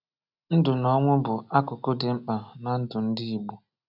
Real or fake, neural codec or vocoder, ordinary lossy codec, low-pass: real; none; none; 5.4 kHz